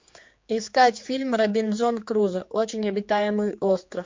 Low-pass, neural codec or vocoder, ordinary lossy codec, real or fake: 7.2 kHz; codec, 16 kHz, 4 kbps, X-Codec, HuBERT features, trained on general audio; MP3, 48 kbps; fake